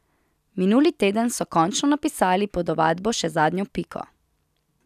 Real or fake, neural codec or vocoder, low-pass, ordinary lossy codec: real; none; 14.4 kHz; none